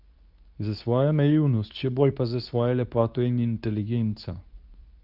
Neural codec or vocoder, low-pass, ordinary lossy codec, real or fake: codec, 24 kHz, 0.9 kbps, WavTokenizer, medium speech release version 2; 5.4 kHz; Opus, 24 kbps; fake